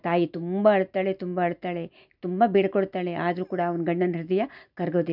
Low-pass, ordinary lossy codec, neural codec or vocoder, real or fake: 5.4 kHz; none; none; real